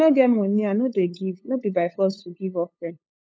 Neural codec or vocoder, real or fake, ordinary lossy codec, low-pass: codec, 16 kHz, 8 kbps, FunCodec, trained on LibriTTS, 25 frames a second; fake; none; none